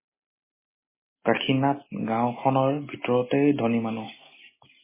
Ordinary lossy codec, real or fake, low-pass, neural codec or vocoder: MP3, 16 kbps; real; 3.6 kHz; none